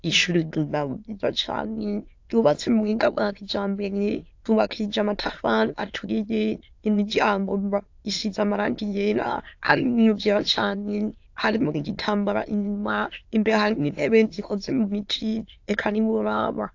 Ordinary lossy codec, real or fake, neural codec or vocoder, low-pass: AAC, 48 kbps; fake; autoencoder, 22.05 kHz, a latent of 192 numbers a frame, VITS, trained on many speakers; 7.2 kHz